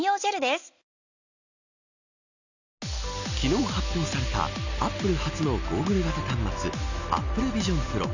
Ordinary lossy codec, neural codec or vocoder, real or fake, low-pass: none; none; real; 7.2 kHz